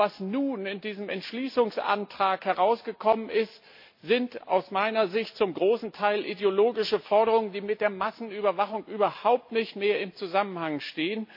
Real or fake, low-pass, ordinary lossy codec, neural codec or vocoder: real; 5.4 kHz; MP3, 32 kbps; none